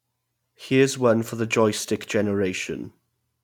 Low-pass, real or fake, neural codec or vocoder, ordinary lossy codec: 19.8 kHz; real; none; none